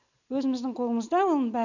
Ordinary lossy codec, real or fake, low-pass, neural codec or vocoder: none; real; 7.2 kHz; none